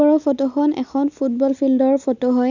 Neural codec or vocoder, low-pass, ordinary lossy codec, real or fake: none; 7.2 kHz; none; real